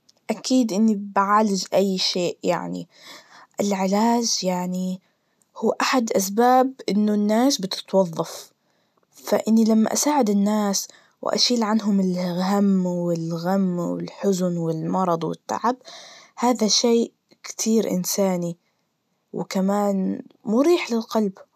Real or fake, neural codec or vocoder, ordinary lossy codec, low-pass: real; none; none; 14.4 kHz